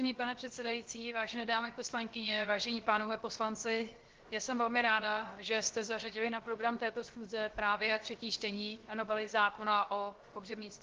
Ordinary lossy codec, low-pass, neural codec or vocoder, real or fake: Opus, 16 kbps; 7.2 kHz; codec, 16 kHz, 0.7 kbps, FocalCodec; fake